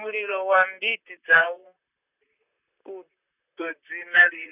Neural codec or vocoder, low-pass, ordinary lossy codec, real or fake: vocoder, 44.1 kHz, 128 mel bands, Pupu-Vocoder; 3.6 kHz; none; fake